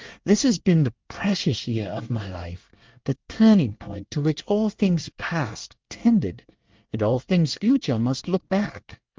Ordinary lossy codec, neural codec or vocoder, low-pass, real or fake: Opus, 32 kbps; codec, 24 kHz, 1 kbps, SNAC; 7.2 kHz; fake